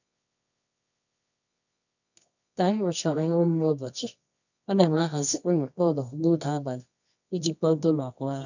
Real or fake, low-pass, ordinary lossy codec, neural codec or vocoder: fake; 7.2 kHz; none; codec, 24 kHz, 0.9 kbps, WavTokenizer, medium music audio release